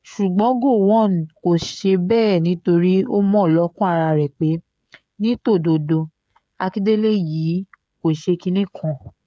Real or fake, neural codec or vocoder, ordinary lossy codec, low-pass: fake; codec, 16 kHz, 16 kbps, FreqCodec, smaller model; none; none